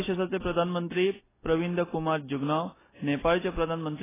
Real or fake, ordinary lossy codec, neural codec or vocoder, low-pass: real; AAC, 16 kbps; none; 3.6 kHz